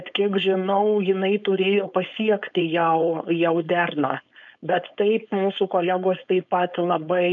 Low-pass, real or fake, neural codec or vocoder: 7.2 kHz; fake; codec, 16 kHz, 4.8 kbps, FACodec